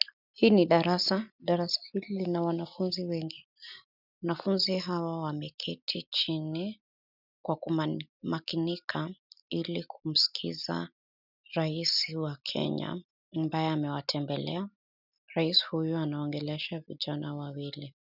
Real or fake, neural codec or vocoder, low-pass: real; none; 5.4 kHz